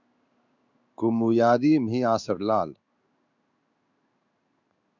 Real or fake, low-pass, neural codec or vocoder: fake; 7.2 kHz; codec, 16 kHz in and 24 kHz out, 1 kbps, XY-Tokenizer